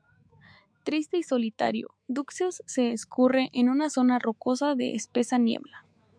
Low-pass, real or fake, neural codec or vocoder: 9.9 kHz; fake; autoencoder, 48 kHz, 128 numbers a frame, DAC-VAE, trained on Japanese speech